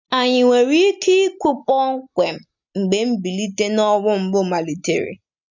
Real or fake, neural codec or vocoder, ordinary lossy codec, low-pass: real; none; none; 7.2 kHz